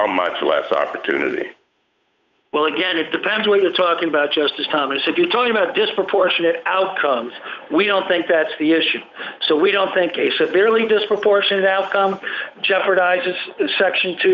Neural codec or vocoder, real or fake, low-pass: codec, 16 kHz, 8 kbps, FunCodec, trained on Chinese and English, 25 frames a second; fake; 7.2 kHz